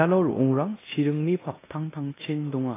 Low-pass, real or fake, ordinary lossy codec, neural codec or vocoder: 3.6 kHz; fake; AAC, 16 kbps; codec, 16 kHz in and 24 kHz out, 0.9 kbps, LongCat-Audio-Codec, four codebook decoder